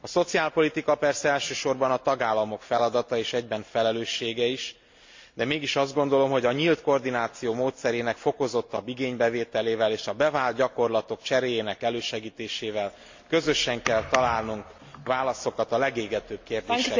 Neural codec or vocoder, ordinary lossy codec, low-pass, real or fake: none; MP3, 48 kbps; 7.2 kHz; real